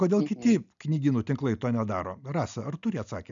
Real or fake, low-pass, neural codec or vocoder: real; 7.2 kHz; none